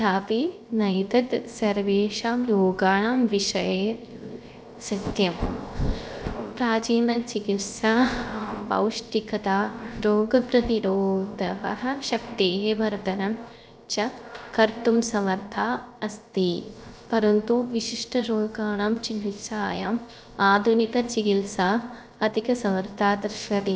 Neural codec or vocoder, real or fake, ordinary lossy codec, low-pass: codec, 16 kHz, 0.3 kbps, FocalCodec; fake; none; none